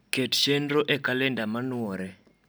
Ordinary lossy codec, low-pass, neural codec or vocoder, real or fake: none; none; none; real